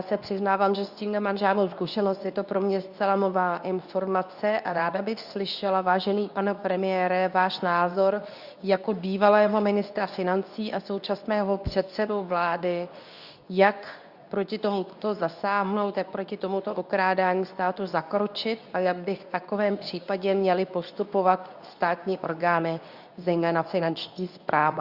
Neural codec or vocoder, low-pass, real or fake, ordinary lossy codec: codec, 24 kHz, 0.9 kbps, WavTokenizer, medium speech release version 2; 5.4 kHz; fake; Opus, 64 kbps